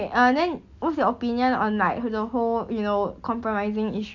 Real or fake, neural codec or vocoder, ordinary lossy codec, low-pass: real; none; none; 7.2 kHz